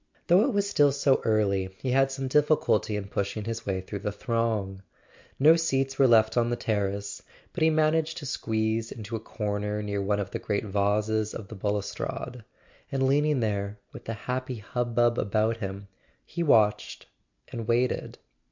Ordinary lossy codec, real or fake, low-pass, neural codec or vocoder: MP3, 64 kbps; real; 7.2 kHz; none